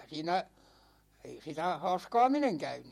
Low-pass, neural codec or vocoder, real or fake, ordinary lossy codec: 19.8 kHz; vocoder, 48 kHz, 128 mel bands, Vocos; fake; MP3, 64 kbps